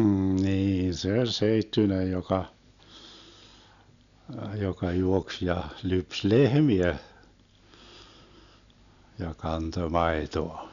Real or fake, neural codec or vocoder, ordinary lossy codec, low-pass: real; none; none; 7.2 kHz